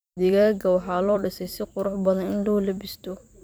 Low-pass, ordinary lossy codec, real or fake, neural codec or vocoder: none; none; fake; vocoder, 44.1 kHz, 128 mel bands every 256 samples, BigVGAN v2